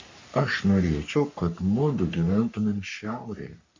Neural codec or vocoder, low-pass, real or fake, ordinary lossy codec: codec, 44.1 kHz, 3.4 kbps, Pupu-Codec; 7.2 kHz; fake; MP3, 48 kbps